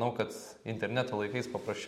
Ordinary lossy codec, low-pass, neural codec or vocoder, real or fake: MP3, 64 kbps; 19.8 kHz; none; real